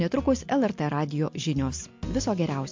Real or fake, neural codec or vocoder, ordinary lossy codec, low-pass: real; none; MP3, 48 kbps; 7.2 kHz